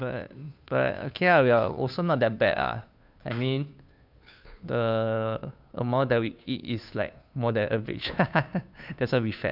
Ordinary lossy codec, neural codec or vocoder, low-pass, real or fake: none; codec, 16 kHz, 2 kbps, FunCodec, trained on Chinese and English, 25 frames a second; 5.4 kHz; fake